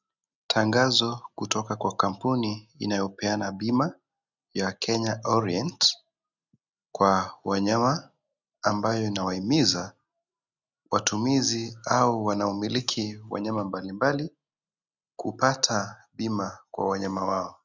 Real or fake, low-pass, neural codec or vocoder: real; 7.2 kHz; none